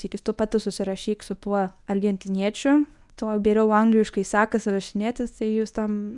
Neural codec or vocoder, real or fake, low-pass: codec, 24 kHz, 0.9 kbps, WavTokenizer, medium speech release version 1; fake; 10.8 kHz